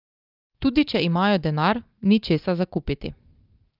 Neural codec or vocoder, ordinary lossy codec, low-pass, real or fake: none; Opus, 32 kbps; 5.4 kHz; real